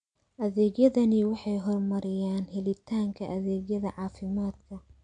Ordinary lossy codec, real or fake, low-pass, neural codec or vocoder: MP3, 64 kbps; real; 9.9 kHz; none